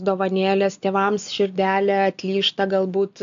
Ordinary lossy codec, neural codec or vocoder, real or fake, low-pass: AAC, 64 kbps; none; real; 7.2 kHz